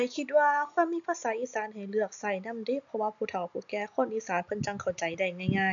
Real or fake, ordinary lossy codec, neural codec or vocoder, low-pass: real; MP3, 96 kbps; none; 7.2 kHz